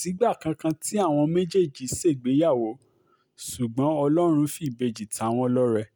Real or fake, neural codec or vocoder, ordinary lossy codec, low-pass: real; none; none; none